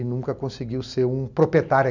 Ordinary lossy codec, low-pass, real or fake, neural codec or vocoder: none; 7.2 kHz; real; none